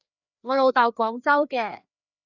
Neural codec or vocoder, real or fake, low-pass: codec, 16 kHz, 2 kbps, FreqCodec, larger model; fake; 7.2 kHz